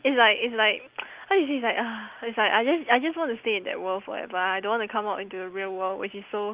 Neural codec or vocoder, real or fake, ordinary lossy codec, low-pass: none; real; Opus, 32 kbps; 3.6 kHz